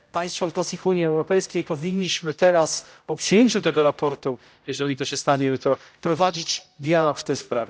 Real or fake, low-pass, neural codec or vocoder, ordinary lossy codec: fake; none; codec, 16 kHz, 0.5 kbps, X-Codec, HuBERT features, trained on general audio; none